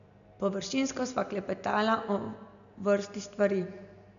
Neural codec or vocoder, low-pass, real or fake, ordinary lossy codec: none; 7.2 kHz; real; none